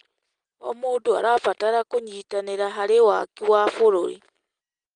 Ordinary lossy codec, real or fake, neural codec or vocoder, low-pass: Opus, 24 kbps; real; none; 9.9 kHz